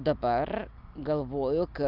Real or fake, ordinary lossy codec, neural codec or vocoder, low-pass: real; Opus, 24 kbps; none; 5.4 kHz